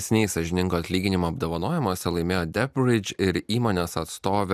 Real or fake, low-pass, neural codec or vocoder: real; 14.4 kHz; none